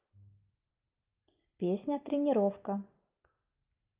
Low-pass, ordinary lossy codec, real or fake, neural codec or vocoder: 3.6 kHz; Opus, 32 kbps; real; none